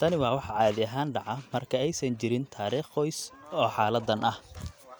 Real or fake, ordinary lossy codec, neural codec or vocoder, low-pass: real; none; none; none